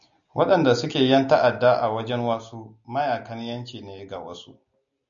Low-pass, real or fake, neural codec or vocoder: 7.2 kHz; real; none